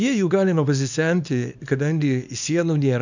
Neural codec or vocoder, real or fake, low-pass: codec, 24 kHz, 0.9 kbps, WavTokenizer, small release; fake; 7.2 kHz